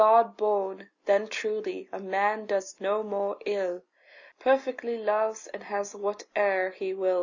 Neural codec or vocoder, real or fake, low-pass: none; real; 7.2 kHz